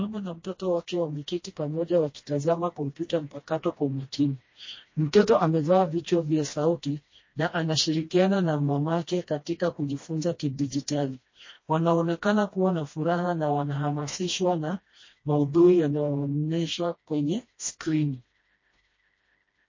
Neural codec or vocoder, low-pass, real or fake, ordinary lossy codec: codec, 16 kHz, 1 kbps, FreqCodec, smaller model; 7.2 kHz; fake; MP3, 32 kbps